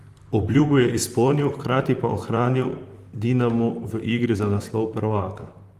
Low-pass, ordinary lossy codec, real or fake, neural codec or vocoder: 14.4 kHz; Opus, 32 kbps; fake; vocoder, 44.1 kHz, 128 mel bands, Pupu-Vocoder